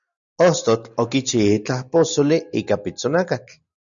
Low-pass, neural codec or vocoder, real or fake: 7.2 kHz; none; real